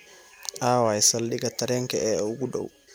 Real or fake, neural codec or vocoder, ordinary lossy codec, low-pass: real; none; none; none